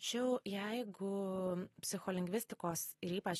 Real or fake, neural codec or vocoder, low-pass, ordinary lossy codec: real; none; 19.8 kHz; AAC, 32 kbps